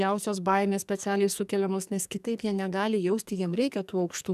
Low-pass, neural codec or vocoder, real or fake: 14.4 kHz; codec, 44.1 kHz, 2.6 kbps, SNAC; fake